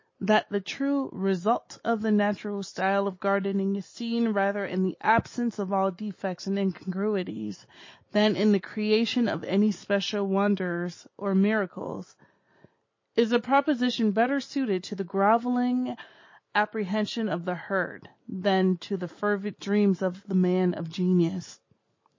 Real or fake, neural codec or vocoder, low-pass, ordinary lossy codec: real; none; 7.2 kHz; MP3, 32 kbps